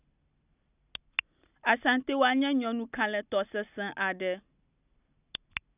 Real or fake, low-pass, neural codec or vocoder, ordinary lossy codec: real; 3.6 kHz; none; none